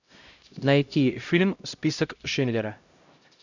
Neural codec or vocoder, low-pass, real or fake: codec, 16 kHz, 0.5 kbps, X-Codec, HuBERT features, trained on LibriSpeech; 7.2 kHz; fake